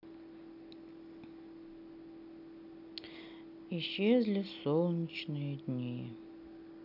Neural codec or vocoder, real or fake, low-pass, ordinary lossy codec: none; real; 5.4 kHz; none